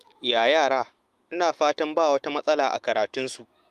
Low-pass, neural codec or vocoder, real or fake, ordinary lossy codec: 14.4 kHz; autoencoder, 48 kHz, 128 numbers a frame, DAC-VAE, trained on Japanese speech; fake; Opus, 32 kbps